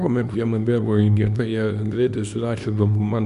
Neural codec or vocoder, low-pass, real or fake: codec, 24 kHz, 0.9 kbps, WavTokenizer, small release; 10.8 kHz; fake